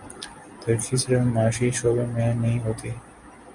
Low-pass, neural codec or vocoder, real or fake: 10.8 kHz; none; real